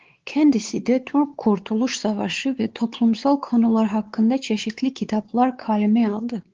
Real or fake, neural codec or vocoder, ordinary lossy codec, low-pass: fake; codec, 16 kHz, 4 kbps, X-Codec, WavLM features, trained on Multilingual LibriSpeech; Opus, 16 kbps; 7.2 kHz